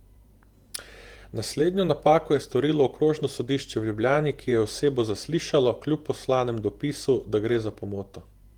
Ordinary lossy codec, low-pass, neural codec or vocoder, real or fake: Opus, 24 kbps; 19.8 kHz; vocoder, 48 kHz, 128 mel bands, Vocos; fake